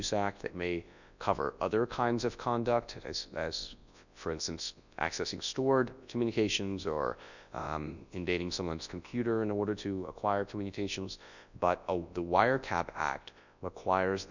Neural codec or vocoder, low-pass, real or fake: codec, 24 kHz, 0.9 kbps, WavTokenizer, large speech release; 7.2 kHz; fake